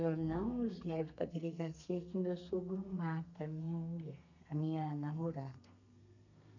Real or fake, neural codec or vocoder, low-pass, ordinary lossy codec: fake; codec, 32 kHz, 1.9 kbps, SNAC; 7.2 kHz; none